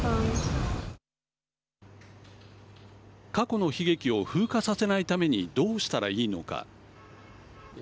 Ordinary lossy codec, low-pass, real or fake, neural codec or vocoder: none; none; real; none